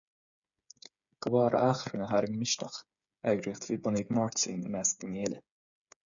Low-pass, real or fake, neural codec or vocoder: 7.2 kHz; fake; codec, 16 kHz, 8 kbps, FreqCodec, smaller model